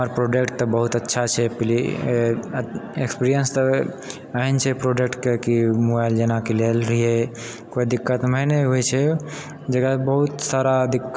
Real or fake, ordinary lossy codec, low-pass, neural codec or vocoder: real; none; none; none